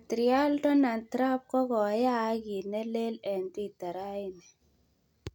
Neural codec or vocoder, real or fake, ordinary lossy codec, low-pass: none; real; none; 19.8 kHz